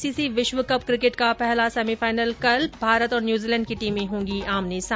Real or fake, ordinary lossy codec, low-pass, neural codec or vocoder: real; none; none; none